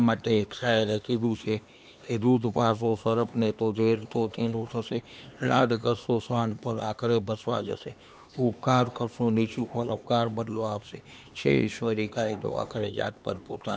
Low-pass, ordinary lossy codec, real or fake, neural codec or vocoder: none; none; fake; codec, 16 kHz, 2 kbps, X-Codec, HuBERT features, trained on LibriSpeech